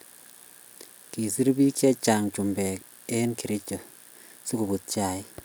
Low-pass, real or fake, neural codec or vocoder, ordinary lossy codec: none; real; none; none